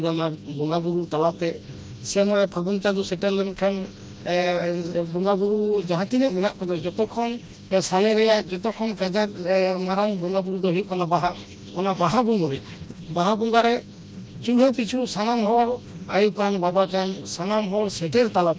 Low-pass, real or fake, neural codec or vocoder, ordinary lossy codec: none; fake; codec, 16 kHz, 1 kbps, FreqCodec, smaller model; none